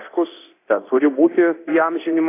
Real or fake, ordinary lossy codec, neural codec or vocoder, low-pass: fake; AAC, 24 kbps; codec, 24 kHz, 0.9 kbps, DualCodec; 3.6 kHz